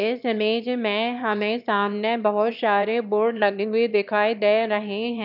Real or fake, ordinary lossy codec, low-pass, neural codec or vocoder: fake; none; 5.4 kHz; autoencoder, 22.05 kHz, a latent of 192 numbers a frame, VITS, trained on one speaker